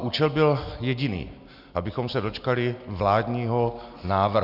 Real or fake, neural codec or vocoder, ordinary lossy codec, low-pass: real; none; Opus, 64 kbps; 5.4 kHz